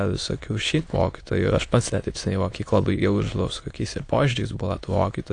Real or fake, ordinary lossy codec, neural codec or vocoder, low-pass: fake; AAC, 48 kbps; autoencoder, 22.05 kHz, a latent of 192 numbers a frame, VITS, trained on many speakers; 9.9 kHz